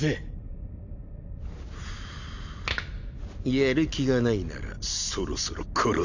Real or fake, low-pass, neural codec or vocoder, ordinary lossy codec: real; 7.2 kHz; none; none